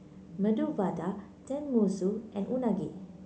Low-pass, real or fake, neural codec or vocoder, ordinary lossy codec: none; real; none; none